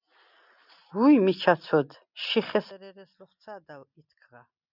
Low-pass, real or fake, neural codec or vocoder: 5.4 kHz; real; none